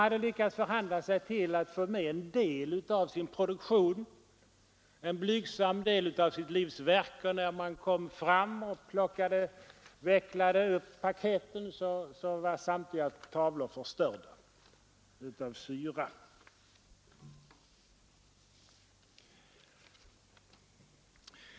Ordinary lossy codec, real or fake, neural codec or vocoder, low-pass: none; real; none; none